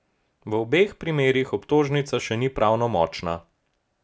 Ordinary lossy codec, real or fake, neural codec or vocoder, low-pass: none; real; none; none